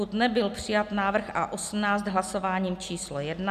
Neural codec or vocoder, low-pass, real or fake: none; 14.4 kHz; real